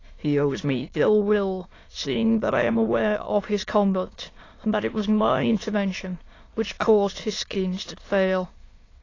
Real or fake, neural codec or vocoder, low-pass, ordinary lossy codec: fake; autoencoder, 22.05 kHz, a latent of 192 numbers a frame, VITS, trained on many speakers; 7.2 kHz; AAC, 32 kbps